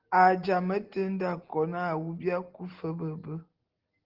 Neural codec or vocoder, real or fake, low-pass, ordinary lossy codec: none; real; 5.4 kHz; Opus, 16 kbps